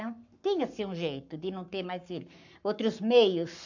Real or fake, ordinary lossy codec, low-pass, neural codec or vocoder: fake; none; 7.2 kHz; codec, 44.1 kHz, 7.8 kbps, Pupu-Codec